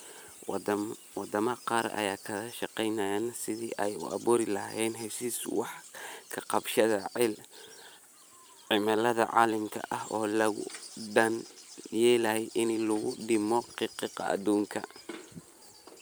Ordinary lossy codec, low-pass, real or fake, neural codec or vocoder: none; none; fake; vocoder, 44.1 kHz, 128 mel bands every 512 samples, BigVGAN v2